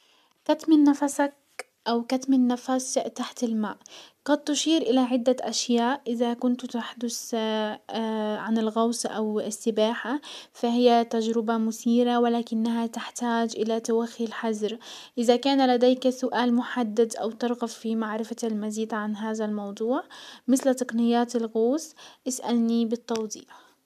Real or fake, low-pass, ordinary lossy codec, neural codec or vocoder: real; 14.4 kHz; MP3, 96 kbps; none